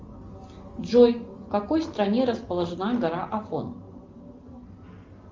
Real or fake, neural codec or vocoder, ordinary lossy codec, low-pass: real; none; Opus, 32 kbps; 7.2 kHz